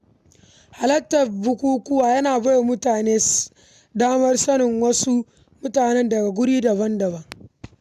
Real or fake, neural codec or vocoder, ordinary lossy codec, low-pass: real; none; none; 14.4 kHz